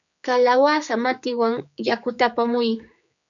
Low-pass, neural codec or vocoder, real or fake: 7.2 kHz; codec, 16 kHz, 4 kbps, X-Codec, HuBERT features, trained on general audio; fake